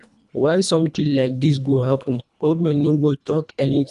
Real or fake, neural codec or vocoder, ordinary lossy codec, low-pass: fake; codec, 24 kHz, 1.5 kbps, HILCodec; none; 10.8 kHz